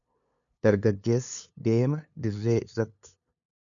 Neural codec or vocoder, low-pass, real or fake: codec, 16 kHz, 2 kbps, FunCodec, trained on LibriTTS, 25 frames a second; 7.2 kHz; fake